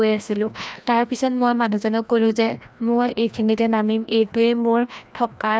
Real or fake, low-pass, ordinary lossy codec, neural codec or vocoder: fake; none; none; codec, 16 kHz, 1 kbps, FreqCodec, larger model